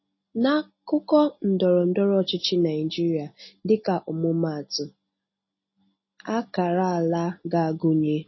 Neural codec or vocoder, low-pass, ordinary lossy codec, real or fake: none; 7.2 kHz; MP3, 24 kbps; real